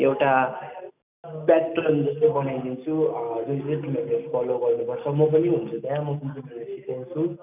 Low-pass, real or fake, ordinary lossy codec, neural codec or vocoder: 3.6 kHz; real; none; none